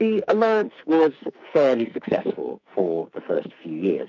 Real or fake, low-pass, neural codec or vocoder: fake; 7.2 kHz; codec, 44.1 kHz, 2.6 kbps, SNAC